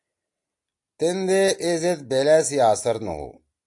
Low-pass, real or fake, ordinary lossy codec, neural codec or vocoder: 10.8 kHz; real; AAC, 64 kbps; none